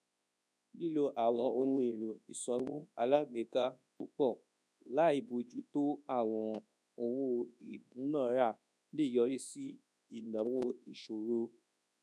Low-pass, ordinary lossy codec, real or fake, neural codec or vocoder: none; none; fake; codec, 24 kHz, 0.9 kbps, WavTokenizer, large speech release